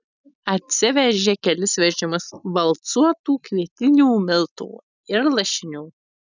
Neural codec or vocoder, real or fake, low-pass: none; real; 7.2 kHz